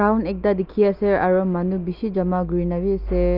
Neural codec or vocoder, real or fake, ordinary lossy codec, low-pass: none; real; Opus, 32 kbps; 5.4 kHz